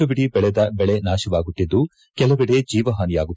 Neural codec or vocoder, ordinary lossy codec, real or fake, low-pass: none; none; real; none